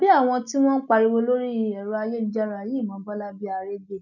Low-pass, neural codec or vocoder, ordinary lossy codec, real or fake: 7.2 kHz; none; none; real